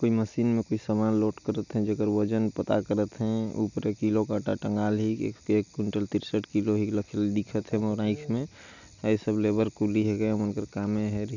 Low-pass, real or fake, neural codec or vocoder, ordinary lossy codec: 7.2 kHz; real; none; none